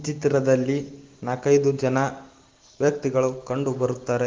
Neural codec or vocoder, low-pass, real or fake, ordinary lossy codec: none; 7.2 kHz; real; Opus, 16 kbps